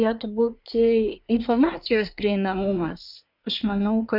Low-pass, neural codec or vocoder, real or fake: 5.4 kHz; codec, 24 kHz, 1 kbps, SNAC; fake